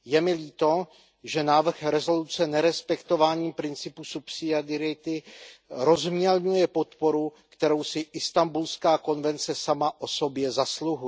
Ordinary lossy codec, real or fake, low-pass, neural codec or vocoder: none; real; none; none